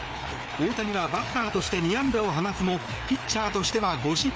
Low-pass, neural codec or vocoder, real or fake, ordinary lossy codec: none; codec, 16 kHz, 4 kbps, FreqCodec, larger model; fake; none